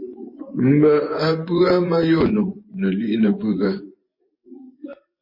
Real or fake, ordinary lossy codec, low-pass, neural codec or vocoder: fake; MP3, 24 kbps; 5.4 kHz; vocoder, 24 kHz, 100 mel bands, Vocos